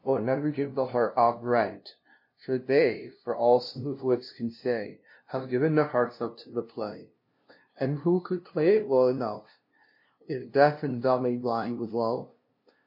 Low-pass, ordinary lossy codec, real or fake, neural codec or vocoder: 5.4 kHz; MP3, 32 kbps; fake; codec, 16 kHz, 0.5 kbps, FunCodec, trained on LibriTTS, 25 frames a second